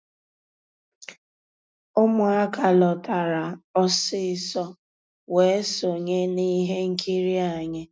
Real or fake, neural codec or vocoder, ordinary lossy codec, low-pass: real; none; none; none